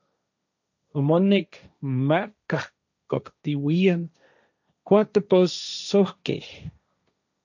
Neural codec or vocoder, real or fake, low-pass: codec, 16 kHz, 1.1 kbps, Voila-Tokenizer; fake; 7.2 kHz